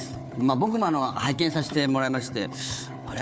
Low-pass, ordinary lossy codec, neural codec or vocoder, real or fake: none; none; codec, 16 kHz, 4 kbps, FunCodec, trained on Chinese and English, 50 frames a second; fake